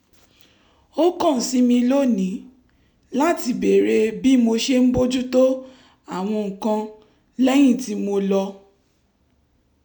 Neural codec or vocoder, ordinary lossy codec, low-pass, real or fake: none; none; 19.8 kHz; real